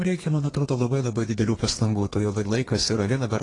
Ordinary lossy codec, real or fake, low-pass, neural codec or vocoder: AAC, 32 kbps; fake; 10.8 kHz; codec, 32 kHz, 1.9 kbps, SNAC